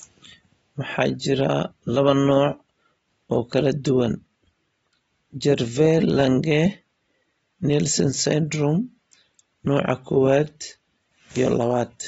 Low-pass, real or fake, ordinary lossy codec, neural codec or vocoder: 10.8 kHz; real; AAC, 24 kbps; none